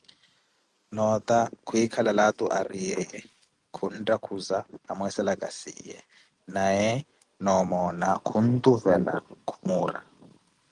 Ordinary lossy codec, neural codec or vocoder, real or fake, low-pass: Opus, 32 kbps; none; real; 10.8 kHz